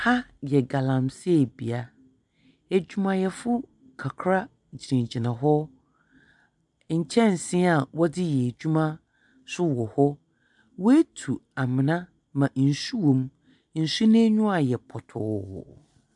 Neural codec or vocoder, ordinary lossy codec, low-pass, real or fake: none; MP3, 96 kbps; 10.8 kHz; real